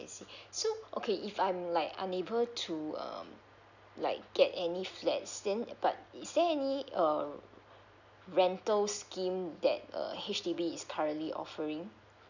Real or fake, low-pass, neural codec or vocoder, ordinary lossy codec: real; 7.2 kHz; none; none